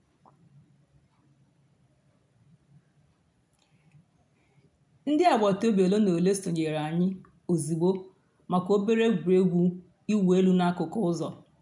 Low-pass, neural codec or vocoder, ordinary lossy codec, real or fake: 10.8 kHz; none; none; real